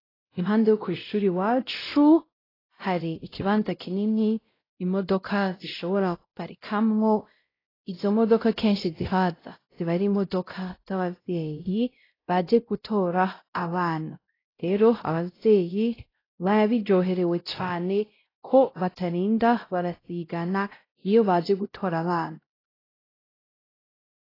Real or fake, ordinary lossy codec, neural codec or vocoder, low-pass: fake; AAC, 24 kbps; codec, 16 kHz, 0.5 kbps, X-Codec, WavLM features, trained on Multilingual LibriSpeech; 5.4 kHz